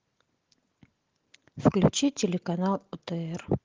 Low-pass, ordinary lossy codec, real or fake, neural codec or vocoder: 7.2 kHz; Opus, 16 kbps; real; none